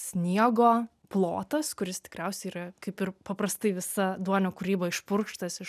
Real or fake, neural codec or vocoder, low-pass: real; none; 14.4 kHz